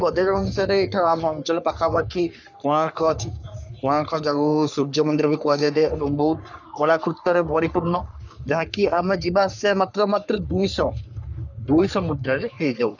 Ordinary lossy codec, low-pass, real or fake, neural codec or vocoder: none; 7.2 kHz; fake; codec, 44.1 kHz, 3.4 kbps, Pupu-Codec